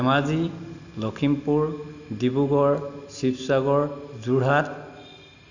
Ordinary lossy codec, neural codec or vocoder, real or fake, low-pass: none; none; real; 7.2 kHz